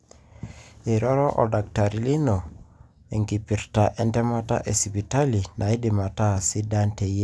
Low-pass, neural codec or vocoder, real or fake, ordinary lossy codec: none; none; real; none